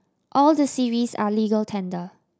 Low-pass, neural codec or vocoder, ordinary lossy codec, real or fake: none; none; none; real